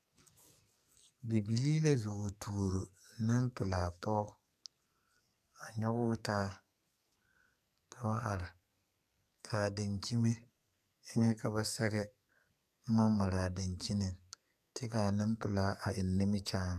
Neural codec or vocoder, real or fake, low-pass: codec, 44.1 kHz, 2.6 kbps, SNAC; fake; 14.4 kHz